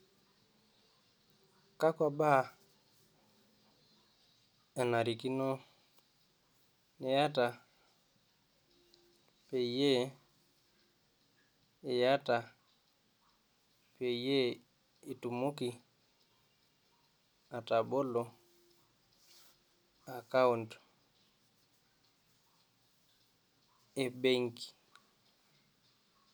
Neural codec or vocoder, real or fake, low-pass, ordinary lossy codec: none; real; none; none